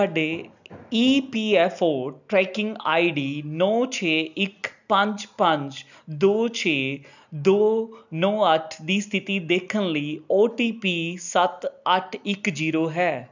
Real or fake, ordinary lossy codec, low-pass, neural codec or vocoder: real; none; 7.2 kHz; none